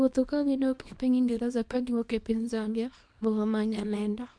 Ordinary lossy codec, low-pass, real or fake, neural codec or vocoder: MP3, 64 kbps; 9.9 kHz; fake; codec, 24 kHz, 0.9 kbps, WavTokenizer, small release